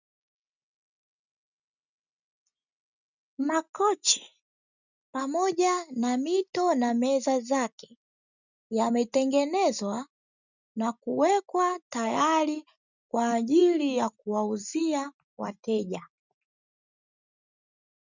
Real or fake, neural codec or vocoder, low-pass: real; none; 7.2 kHz